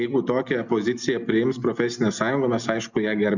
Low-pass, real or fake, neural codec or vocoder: 7.2 kHz; real; none